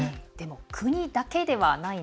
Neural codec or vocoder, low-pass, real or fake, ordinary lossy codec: none; none; real; none